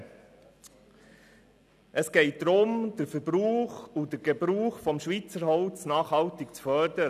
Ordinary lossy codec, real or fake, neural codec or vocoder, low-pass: none; real; none; 14.4 kHz